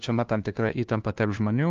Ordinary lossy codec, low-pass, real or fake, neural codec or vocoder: Opus, 24 kbps; 7.2 kHz; fake; codec, 16 kHz, 1 kbps, FunCodec, trained on LibriTTS, 50 frames a second